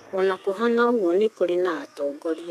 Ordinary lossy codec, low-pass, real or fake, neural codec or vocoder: none; 14.4 kHz; fake; codec, 32 kHz, 1.9 kbps, SNAC